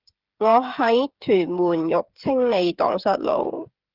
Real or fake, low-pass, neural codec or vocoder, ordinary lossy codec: fake; 5.4 kHz; codec, 16 kHz, 8 kbps, FreqCodec, smaller model; Opus, 32 kbps